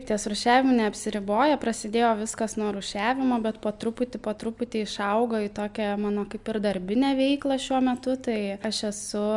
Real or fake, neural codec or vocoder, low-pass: real; none; 10.8 kHz